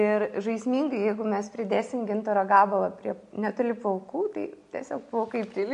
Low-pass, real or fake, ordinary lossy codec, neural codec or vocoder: 14.4 kHz; real; MP3, 48 kbps; none